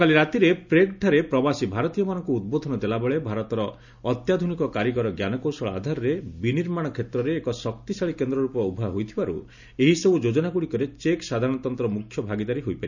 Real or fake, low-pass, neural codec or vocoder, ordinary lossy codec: real; 7.2 kHz; none; none